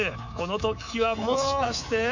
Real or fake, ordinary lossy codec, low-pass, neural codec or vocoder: fake; none; 7.2 kHz; codec, 24 kHz, 3.1 kbps, DualCodec